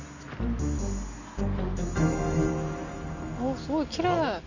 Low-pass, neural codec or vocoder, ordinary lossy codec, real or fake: 7.2 kHz; none; none; real